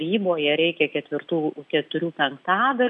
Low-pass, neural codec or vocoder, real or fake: 10.8 kHz; none; real